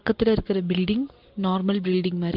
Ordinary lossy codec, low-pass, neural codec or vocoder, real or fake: Opus, 16 kbps; 5.4 kHz; none; real